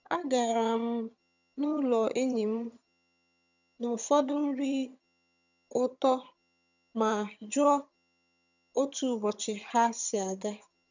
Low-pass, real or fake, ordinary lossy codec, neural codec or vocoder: 7.2 kHz; fake; none; vocoder, 22.05 kHz, 80 mel bands, HiFi-GAN